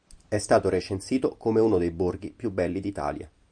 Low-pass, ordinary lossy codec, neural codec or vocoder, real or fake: 9.9 kHz; MP3, 96 kbps; none; real